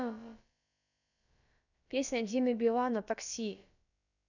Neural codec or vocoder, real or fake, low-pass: codec, 16 kHz, about 1 kbps, DyCAST, with the encoder's durations; fake; 7.2 kHz